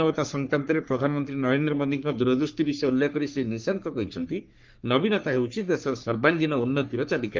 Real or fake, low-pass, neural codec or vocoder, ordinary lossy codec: fake; 7.2 kHz; codec, 44.1 kHz, 3.4 kbps, Pupu-Codec; Opus, 32 kbps